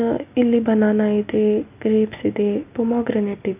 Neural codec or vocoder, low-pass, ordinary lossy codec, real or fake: none; 3.6 kHz; none; real